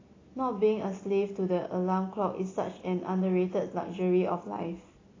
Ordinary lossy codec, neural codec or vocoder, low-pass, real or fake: AAC, 32 kbps; none; 7.2 kHz; real